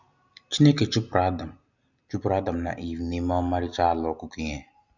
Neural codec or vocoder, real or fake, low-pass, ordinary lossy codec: none; real; 7.2 kHz; none